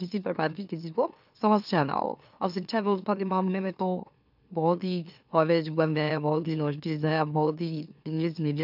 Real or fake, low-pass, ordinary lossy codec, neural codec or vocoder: fake; 5.4 kHz; none; autoencoder, 44.1 kHz, a latent of 192 numbers a frame, MeloTTS